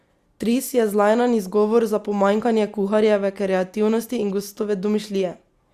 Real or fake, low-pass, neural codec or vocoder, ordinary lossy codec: real; 14.4 kHz; none; Opus, 64 kbps